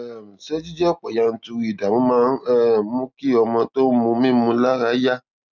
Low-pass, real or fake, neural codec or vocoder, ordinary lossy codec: 7.2 kHz; real; none; none